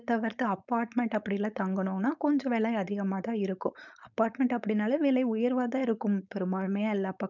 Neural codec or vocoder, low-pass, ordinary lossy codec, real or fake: codec, 16 kHz, 4.8 kbps, FACodec; 7.2 kHz; none; fake